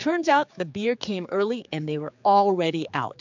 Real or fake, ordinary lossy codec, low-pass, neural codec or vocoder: fake; MP3, 64 kbps; 7.2 kHz; codec, 16 kHz, 4 kbps, X-Codec, HuBERT features, trained on general audio